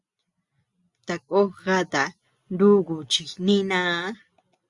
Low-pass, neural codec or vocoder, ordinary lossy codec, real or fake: 10.8 kHz; none; Opus, 64 kbps; real